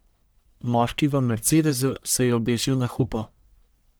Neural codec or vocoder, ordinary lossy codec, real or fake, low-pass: codec, 44.1 kHz, 1.7 kbps, Pupu-Codec; none; fake; none